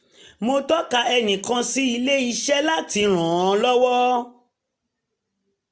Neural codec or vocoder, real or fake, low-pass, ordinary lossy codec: none; real; none; none